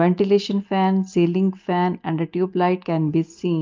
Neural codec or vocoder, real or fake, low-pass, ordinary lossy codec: none; real; 7.2 kHz; Opus, 32 kbps